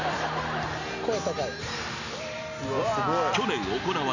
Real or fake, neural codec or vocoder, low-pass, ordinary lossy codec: real; none; 7.2 kHz; none